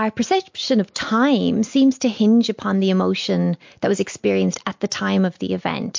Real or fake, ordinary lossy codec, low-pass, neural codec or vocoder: real; MP3, 48 kbps; 7.2 kHz; none